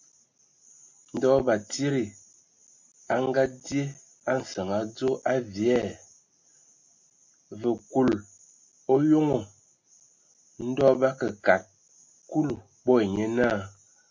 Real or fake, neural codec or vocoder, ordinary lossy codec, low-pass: real; none; MP3, 48 kbps; 7.2 kHz